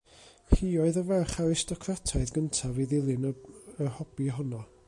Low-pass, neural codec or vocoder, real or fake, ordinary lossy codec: 10.8 kHz; none; real; MP3, 64 kbps